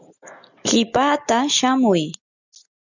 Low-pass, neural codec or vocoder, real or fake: 7.2 kHz; none; real